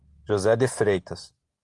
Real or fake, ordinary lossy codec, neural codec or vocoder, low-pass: real; Opus, 24 kbps; none; 10.8 kHz